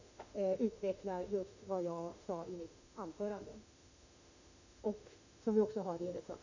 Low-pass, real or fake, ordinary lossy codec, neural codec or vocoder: 7.2 kHz; fake; none; autoencoder, 48 kHz, 32 numbers a frame, DAC-VAE, trained on Japanese speech